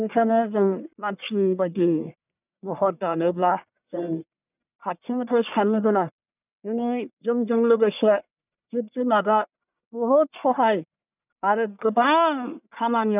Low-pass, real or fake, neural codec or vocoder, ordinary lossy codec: 3.6 kHz; fake; codec, 44.1 kHz, 1.7 kbps, Pupu-Codec; none